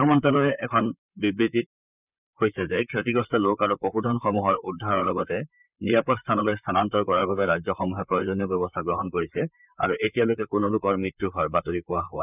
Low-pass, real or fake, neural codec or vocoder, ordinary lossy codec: 3.6 kHz; fake; vocoder, 44.1 kHz, 128 mel bands, Pupu-Vocoder; none